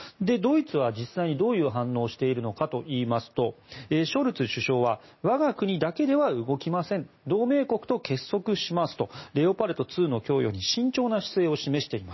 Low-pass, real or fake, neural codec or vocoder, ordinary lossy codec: 7.2 kHz; real; none; MP3, 24 kbps